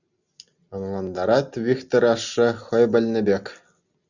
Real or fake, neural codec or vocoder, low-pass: real; none; 7.2 kHz